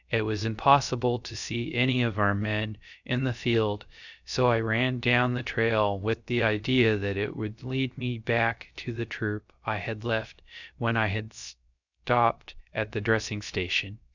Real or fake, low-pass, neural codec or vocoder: fake; 7.2 kHz; codec, 16 kHz, 0.3 kbps, FocalCodec